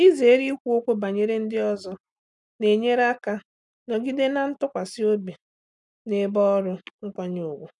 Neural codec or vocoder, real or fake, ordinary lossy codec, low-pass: none; real; none; 10.8 kHz